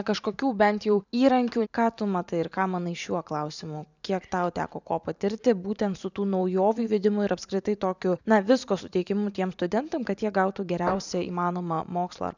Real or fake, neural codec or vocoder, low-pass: fake; vocoder, 44.1 kHz, 128 mel bands every 512 samples, BigVGAN v2; 7.2 kHz